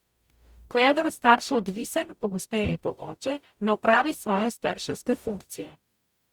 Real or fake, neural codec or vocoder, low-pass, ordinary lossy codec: fake; codec, 44.1 kHz, 0.9 kbps, DAC; 19.8 kHz; Opus, 64 kbps